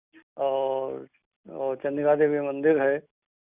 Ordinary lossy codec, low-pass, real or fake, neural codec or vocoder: none; 3.6 kHz; real; none